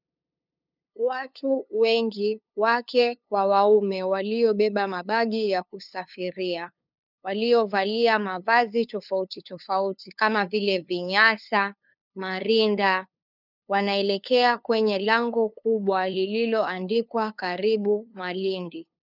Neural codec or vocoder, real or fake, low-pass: codec, 16 kHz, 2 kbps, FunCodec, trained on LibriTTS, 25 frames a second; fake; 5.4 kHz